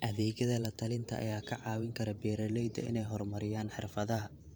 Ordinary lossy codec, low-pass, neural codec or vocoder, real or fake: none; none; vocoder, 44.1 kHz, 128 mel bands every 512 samples, BigVGAN v2; fake